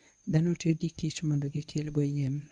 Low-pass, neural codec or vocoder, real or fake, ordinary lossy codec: 10.8 kHz; codec, 24 kHz, 0.9 kbps, WavTokenizer, medium speech release version 1; fake; none